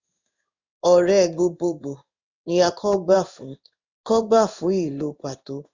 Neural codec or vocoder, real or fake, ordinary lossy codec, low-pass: codec, 16 kHz in and 24 kHz out, 1 kbps, XY-Tokenizer; fake; Opus, 64 kbps; 7.2 kHz